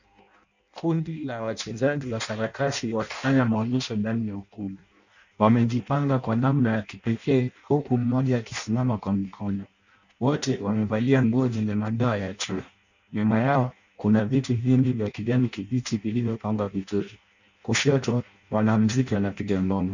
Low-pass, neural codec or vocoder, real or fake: 7.2 kHz; codec, 16 kHz in and 24 kHz out, 0.6 kbps, FireRedTTS-2 codec; fake